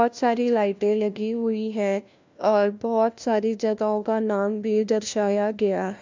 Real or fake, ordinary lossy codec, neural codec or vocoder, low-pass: fake; MP3, 64 kbps; codec, 16 kHz, 1 kbps, FunCodec, trained on LibriTTS, 50 frames a second; 7.2 kHz